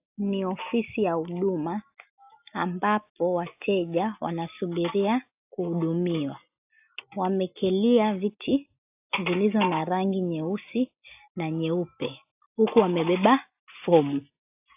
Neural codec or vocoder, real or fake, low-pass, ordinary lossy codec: none; real; 3.6 kHz; AAC, 32 kbps